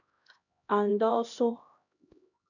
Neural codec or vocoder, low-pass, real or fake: codec, 16 kHz, 1 kbps, X-Codec, HuBERT features, trained on LibriSpeech; 7.2 kHz; fake